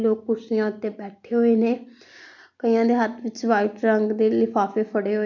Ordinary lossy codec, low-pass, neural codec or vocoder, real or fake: none; 7.2 kHz; none; real